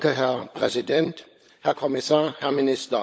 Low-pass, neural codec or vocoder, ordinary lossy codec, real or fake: none; codec, 16 kHz, 16 kbps, FunCodec, trained on LibriTTS, 50 frames a second; none; fake